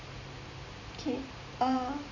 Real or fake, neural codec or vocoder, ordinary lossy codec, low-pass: fake; vocoder, 44.1 kHz, 128 mel bands every 512 samples, BigVGAN v2; none; 7.2 kHz